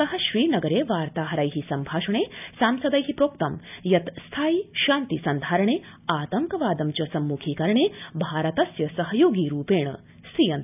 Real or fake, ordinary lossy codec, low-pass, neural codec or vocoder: real; none; 3.6 kHz; none